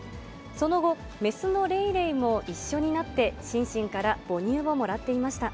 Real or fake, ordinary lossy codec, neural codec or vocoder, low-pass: real; none; none; none